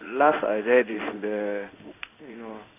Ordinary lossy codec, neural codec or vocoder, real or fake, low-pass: none; codec, 16 kHz in and 24 kHz out, 1 kbps, XY-Tokenizer; fake; 3.6 kHz